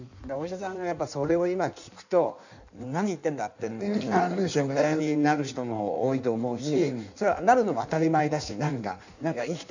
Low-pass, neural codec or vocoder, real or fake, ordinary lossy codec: 7.2 kHz; codec, 16 kHz in and 24 kHz out, 1.1 kbps, FireRedTTS-2 codec; fake; none